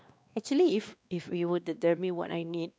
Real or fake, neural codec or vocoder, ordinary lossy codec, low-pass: fake; codec, 16 kHz, 2 kbps, X-Codec, WavLM features, trained on Multilingual LibriSpeech; none; none